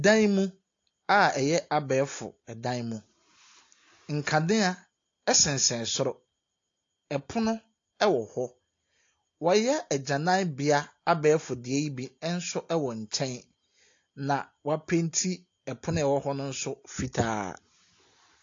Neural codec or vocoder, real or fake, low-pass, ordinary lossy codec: none; real; 7.2 kHz; AAC, 48 kbps